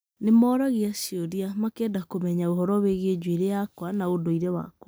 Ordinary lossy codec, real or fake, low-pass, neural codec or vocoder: none; real; none; none